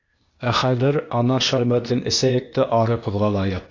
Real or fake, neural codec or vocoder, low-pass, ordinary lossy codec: fake; codec, 16 kHz, 0.8 kbps, ZipCodec; 7.2 kHz; AAC, 48 kbps